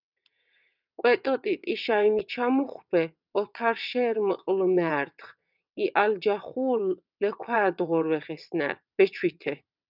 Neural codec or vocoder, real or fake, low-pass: vocoder, 22.05 kHz, 80 mel bands, WaveNeXt; fake; 5.4 kHz